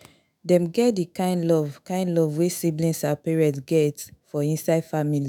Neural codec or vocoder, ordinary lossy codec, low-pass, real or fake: autoencoder, 48 kHz, 128 numbers a frame, DAC-VAE, trained on Japanese speech; none; none; fake